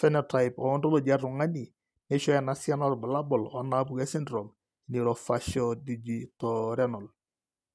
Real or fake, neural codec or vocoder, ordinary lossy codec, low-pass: real; none; none; none